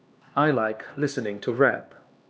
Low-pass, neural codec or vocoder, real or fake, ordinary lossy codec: none; codec, 16 kHz, 2 kbps, X-Codec, HuBERT features, trained on LibriSpeech; fake; none